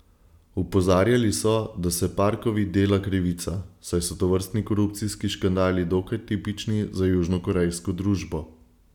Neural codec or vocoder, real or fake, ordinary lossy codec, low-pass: none; real; none; 19.8 kHz